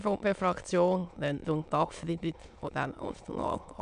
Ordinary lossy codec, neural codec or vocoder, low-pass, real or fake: AAC, 96 kbps; autoencoder, 22.05 kHz, a latent of 192 numbers a frame, VITS, trained on many speakers; 9.9 kHz; fake